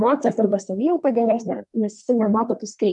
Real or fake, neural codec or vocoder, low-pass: fake; codec, 24 kHz, 1 kbps, SNAC; 10.8 kHz